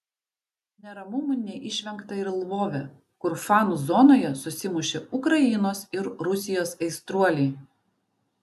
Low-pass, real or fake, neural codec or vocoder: 14.4 kHz; real; none